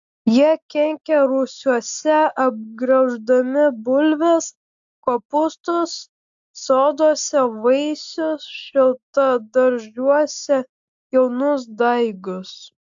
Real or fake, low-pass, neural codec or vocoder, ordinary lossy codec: real; 7.2 kHz; none; AAC, 64 kbps